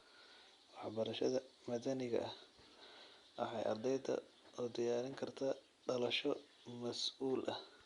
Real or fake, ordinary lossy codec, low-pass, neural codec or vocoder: real; Opus, 64 kbps; 10.8 kHz; none